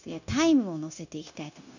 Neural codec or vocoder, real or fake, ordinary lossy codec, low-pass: codec, 16 kHz, 0.9 kbps, LongCat-Audio-Codec; fake; none; 7.2 kHz